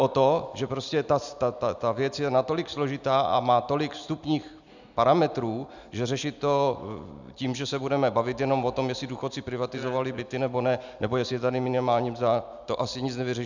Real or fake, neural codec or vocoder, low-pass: real; none; 7.2 kHz